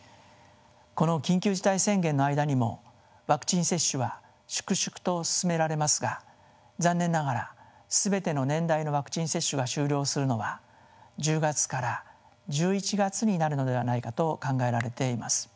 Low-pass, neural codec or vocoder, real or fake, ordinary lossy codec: none; none; real; none